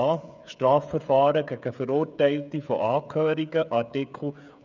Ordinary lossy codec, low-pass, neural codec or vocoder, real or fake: none; 7.2 kHz; codec, 16 kHz, 16 kbps, FreqCodec, smaller model; fake